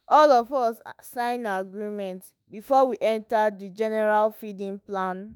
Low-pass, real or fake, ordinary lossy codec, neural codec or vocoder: none; fake; none; autoencoder, 48 kHz, 32 numbers a frame, DAC-VAE, trained on Japanese speech